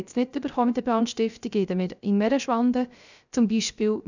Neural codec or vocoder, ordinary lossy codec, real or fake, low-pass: codec, 16 kHz, 0.3 kbps, FocalCodec; none; fake; 7.2 kHz